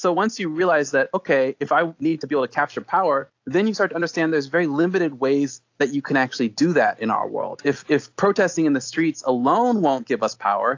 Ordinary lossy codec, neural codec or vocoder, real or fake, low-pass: AAC, 48 kbps; none; real; 7.2 kHz